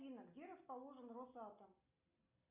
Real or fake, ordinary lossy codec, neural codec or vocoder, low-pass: real; Opus, 64 kbps; none; 3.6 kHz